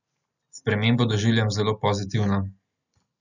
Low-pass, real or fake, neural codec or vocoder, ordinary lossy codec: 7.2 kHz; real; none; none